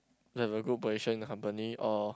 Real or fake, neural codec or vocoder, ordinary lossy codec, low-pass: real; none; none; none